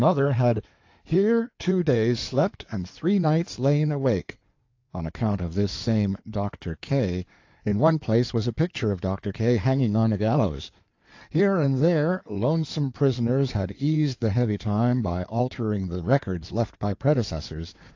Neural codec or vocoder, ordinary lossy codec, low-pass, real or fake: codec, 16 kHz in and 24 kHz out, 2.2 kbps, FireRedTTS-2 codec; AAC, 48 kbps; 7.2 kHz; fake